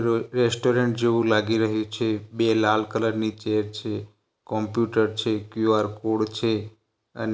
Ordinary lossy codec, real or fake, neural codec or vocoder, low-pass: none; real; none; none